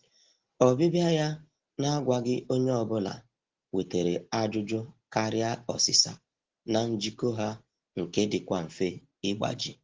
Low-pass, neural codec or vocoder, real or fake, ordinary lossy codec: 7.2 kHz; none; real; Opus, 16 kbps